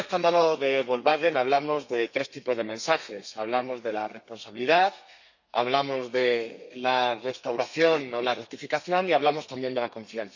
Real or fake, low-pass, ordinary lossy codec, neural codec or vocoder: fake; 7.2 kHz; none; codec, 32 kHz, 1.9 kbps, SNAC